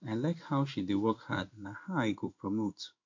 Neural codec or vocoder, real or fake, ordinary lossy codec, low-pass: codec, 16 kHz in and 24 kHz out, 1 kbps, XY-Tokenizer; fake; MP3, 48 kbps; 7.2 kHz